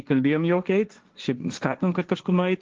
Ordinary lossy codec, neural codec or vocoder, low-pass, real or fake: Opus, 24 kbps; codec, 16 kHz, 1.1 kbps, Voila-Tokenizer; 7.2 kHz; fake